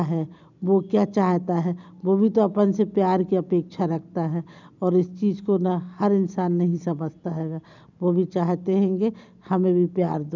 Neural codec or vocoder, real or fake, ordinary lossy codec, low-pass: none; real; none; 7.2 kHz